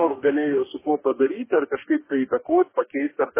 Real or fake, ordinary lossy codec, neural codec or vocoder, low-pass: fake; MP3, 16 kbps; codec, 44.1 kHz, 2.6 kbps, DAC; 3.6 kHz